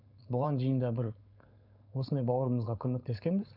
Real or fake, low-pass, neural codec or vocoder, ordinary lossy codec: fake; 5.4 kHz; codec, 16 kHz, 4.8 kbps, FACodec; none